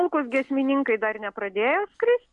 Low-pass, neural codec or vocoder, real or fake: 10.8 kHz; none; real